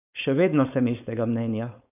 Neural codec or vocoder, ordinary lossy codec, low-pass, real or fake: codec, 16 kHz, 4.8 kbps, FACodec; none; 3.6 kHz; fake